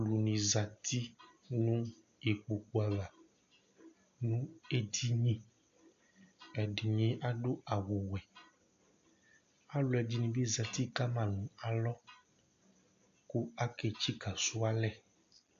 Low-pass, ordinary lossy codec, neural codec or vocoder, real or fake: 7.2 kHz; AAC, 64 kbps; none; real